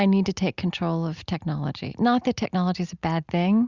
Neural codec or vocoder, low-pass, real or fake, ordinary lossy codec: none; 7.2 kHz; real; Opus, 64 kbps